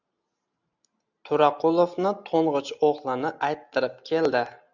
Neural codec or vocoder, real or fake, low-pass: none; real; 7.2 kHz